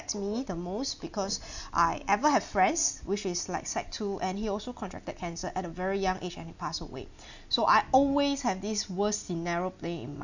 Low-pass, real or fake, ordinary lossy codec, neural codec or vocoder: 7.2 kHz; real; none; none